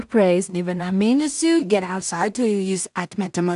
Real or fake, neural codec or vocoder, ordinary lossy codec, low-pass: fake; codec, 16 kHz in and 24 kHz out, 0.4 kbps, LongCat-Audio-Codec, two codebook decoder; AAC, 96 kbps; 10.8 kHz